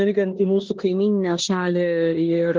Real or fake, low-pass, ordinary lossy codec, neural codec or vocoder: fake; 7.2 kHz; Opus, 16 kbps; codec, 16 kHz in and 24 kHz out, 0.9 kbps, LongCat-Audio-Codec, four codebook decoder